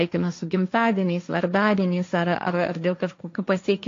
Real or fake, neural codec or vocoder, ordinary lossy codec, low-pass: fake; codec, 16 kHz, 1.1 kbps, Voila-Tokenizer; AAC, 48 kbps; 7.2 kHz